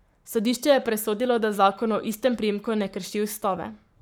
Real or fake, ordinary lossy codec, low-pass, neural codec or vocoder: fake; none; none; codec, 44.1 kHz, 7.8 kbps, Pupu-Codec